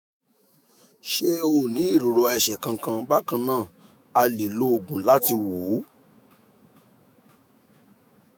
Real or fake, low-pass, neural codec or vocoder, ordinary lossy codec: fake; none; autoencoder, 48 kHz, 128 numbers a frame, DAC-VAE, trained on Japanese speech; none